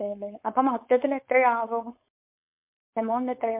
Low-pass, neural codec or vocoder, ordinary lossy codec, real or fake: 3.6 kHz; codec, 16 kHz, 4.8 kbps, FACodec; MP3, 32 kbps; fake